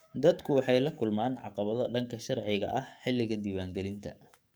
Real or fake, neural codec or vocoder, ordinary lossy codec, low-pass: fake; codec, 44.1 kHz, 7.8 kbps, DAC; none; none